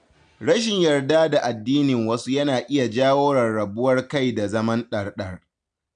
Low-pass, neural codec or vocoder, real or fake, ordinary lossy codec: 9.9 kHz; none; real; none